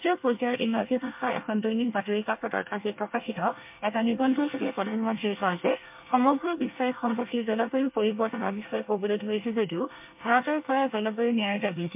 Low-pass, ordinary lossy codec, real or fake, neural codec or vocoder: 3.6 kHz; MP3, 32 kbps; fake; codec, 24 kHz, 1 kbps, SNAC